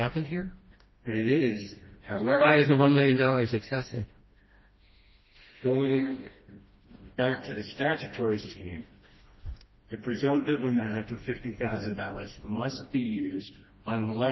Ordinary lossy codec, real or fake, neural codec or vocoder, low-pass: MP3, 24 kbps; fake; codec, 16 kHz, 1 kbps, FreqCodec, smaller model; 7.2 kHz